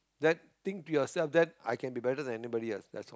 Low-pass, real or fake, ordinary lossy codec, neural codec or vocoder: none; real; none; none